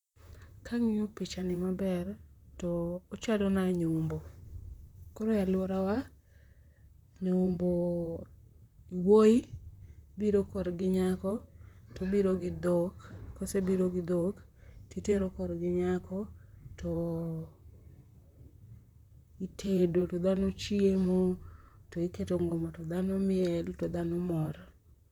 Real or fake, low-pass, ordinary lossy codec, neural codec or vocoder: fake; 19.8 kHz; none; vocoder, 44.1 kHz, 128 mel bands, Pupu-Vocoder